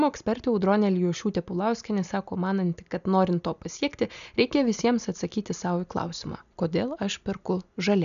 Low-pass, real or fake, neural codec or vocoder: 7.2 kHz; real; none